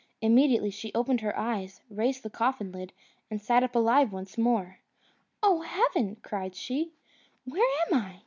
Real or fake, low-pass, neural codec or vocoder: real; 7.2 kHz; none